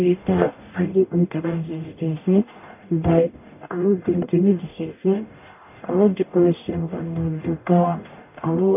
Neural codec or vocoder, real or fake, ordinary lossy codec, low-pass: codec, 44.1 kHz, 0.9 kbps, DAC; fake; none; 3.6 kHz